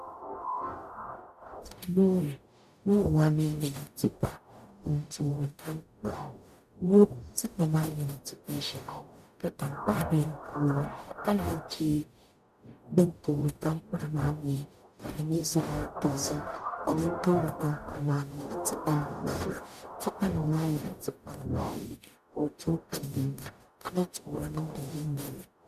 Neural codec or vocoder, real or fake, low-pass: codec, 44.1 kHz, 0.9 kbps, DAC; fake; 14.4 kHz